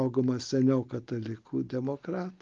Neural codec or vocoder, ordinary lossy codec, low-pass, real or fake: none; Opus, 32 kbps; 7.2 kHz; real